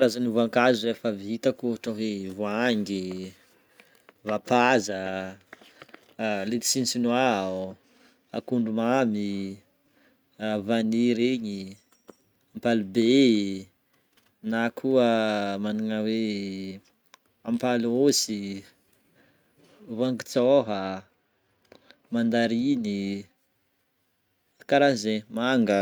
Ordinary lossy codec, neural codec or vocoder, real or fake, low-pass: none; none; real; none